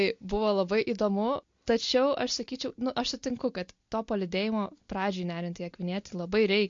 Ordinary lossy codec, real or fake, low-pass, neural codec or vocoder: MP3, 48 kbps; real; 7.2 kHz; none